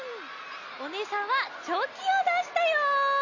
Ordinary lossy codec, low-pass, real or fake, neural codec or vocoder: none; 7.2 kHz; real; none